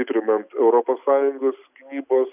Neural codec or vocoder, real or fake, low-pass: none; real; 3.6 kHz